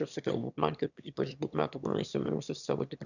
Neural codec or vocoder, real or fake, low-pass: autoencoder, 22.05 kHz, a latent of 192 numbers a frame, VITS, trained on one speaker; fake; 7.2 kHz